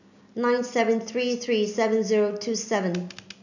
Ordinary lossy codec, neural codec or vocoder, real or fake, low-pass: none; none; real; 7.2 kHz